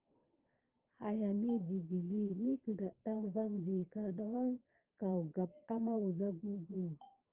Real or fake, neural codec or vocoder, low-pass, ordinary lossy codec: fake; vocoder, 22.05 kHz, 80 mel bands, Vocos; 3.6 kHz; Opus, 16 kbps